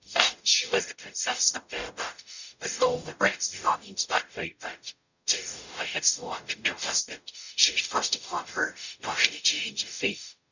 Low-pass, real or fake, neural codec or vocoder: 7.2 kHz; fake; codec, 44.1 kHz, 0.9 kbps, DAC